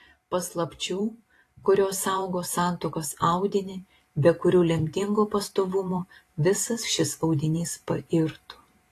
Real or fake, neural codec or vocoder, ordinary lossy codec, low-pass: fake; vocoder, 44.1 kHz, 128 mel bands every 512 samples, BigVGAN v2; AAC, 48 kbps; 14.4 kHz